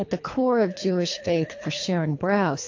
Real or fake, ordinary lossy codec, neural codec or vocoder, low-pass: fake; AAC, 48 kbps; codec, 24 kHz, 3 kbps, HILCodec; 7.2 kHz